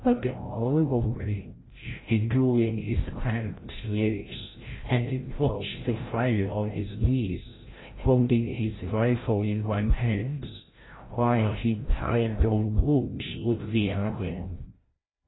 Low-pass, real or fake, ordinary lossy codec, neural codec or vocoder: 7.2 kHz; fake; AAC, 16 kbps; codec, 16 kHz, 0.5 kbps, FreqCodec, larger model